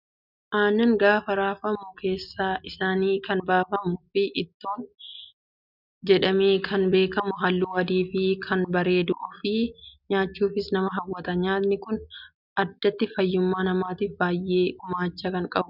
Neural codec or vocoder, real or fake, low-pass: none; real; 5.4 kHz